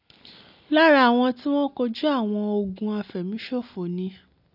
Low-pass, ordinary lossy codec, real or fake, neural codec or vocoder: 5.4 kHz; none; real; none